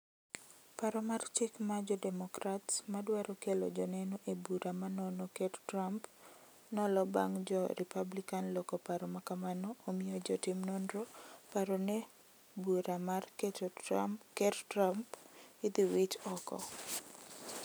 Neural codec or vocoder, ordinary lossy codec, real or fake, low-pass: none; none; real; none